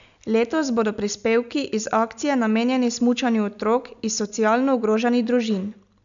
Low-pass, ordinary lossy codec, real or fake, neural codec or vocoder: 7.2 kHz; none; real; none